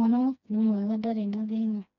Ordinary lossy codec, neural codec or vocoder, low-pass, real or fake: none; codec, 16 kHz, 2 kbps, FreqCodec, smaller model; 7.2 kHz; fake